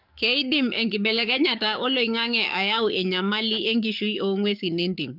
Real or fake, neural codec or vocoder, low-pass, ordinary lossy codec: fake; codec, 44.1 kHz, 7.8 kbps, DAC; 5.4 kHz; none